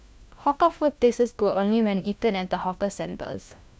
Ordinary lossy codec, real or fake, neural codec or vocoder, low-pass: none; fake; codec, 16 kHz, 1 kbps, FunCodec, trained on LibriTTS, 50 frames a second; none